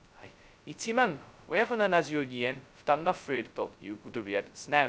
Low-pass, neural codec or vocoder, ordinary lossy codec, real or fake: none; codec, 16 kHz, 0.2 kbps, FocalCodec; none; fake